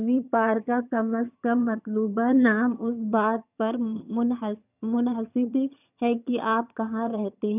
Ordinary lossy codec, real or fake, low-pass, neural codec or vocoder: none; fake; 3.6 kHz; vocoder, 22.05 kHz, 80 mel bands, HiFi-GAN